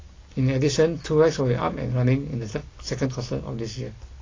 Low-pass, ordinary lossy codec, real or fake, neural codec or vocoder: 7.2 kHz; AAC, 32 kbps; real; none